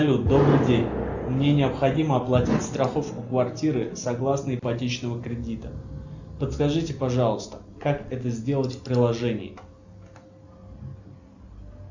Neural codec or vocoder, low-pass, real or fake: none; 7.2 kHz; real